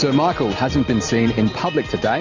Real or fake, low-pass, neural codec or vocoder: real; 7.2 kHz; none